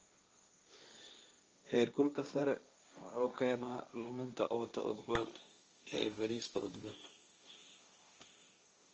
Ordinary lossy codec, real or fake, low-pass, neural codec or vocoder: Opus, 24 kbps; fake; 7.2 kHz; codec, 16 kHz, 1.1 kbps, Voila-Tokenizer